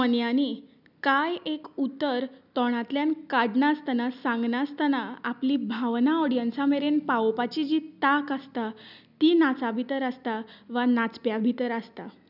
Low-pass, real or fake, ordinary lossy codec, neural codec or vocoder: 5.4 kHz; real; none; none